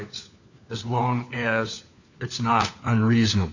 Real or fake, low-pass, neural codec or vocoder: fake; 7.2 kHz; codec, 16 kHz, 4 kbps, FunCodec, trained on LibriTTS, 50 frames a second